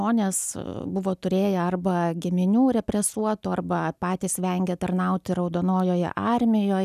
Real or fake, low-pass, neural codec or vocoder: real; 14.4 kHz; none